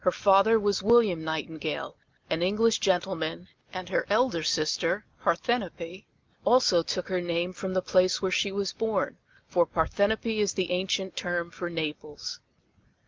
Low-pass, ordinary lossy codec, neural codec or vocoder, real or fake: 7.2 kHz; Opus, 32 kbps; none; real